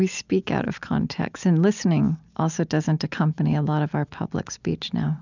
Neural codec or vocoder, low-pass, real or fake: none; 7.2 kHz; real